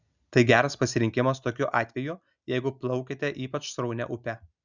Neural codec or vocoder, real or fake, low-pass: none; real; 7.2 kHz